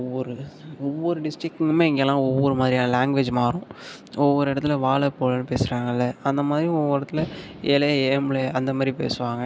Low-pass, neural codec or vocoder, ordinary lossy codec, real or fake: none; none; none; real